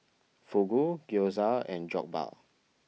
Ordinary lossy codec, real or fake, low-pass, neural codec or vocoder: none; real; none; none